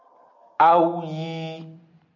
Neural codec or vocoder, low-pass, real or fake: none; 7.2 kHz; real